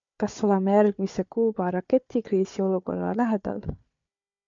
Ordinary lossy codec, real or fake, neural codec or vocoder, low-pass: MP3, 64 kbps; fake; codec, 16 kHz, 4 kbps, FunCodec, trained on Chinese and English, 50 frames a second; 7.2 kHz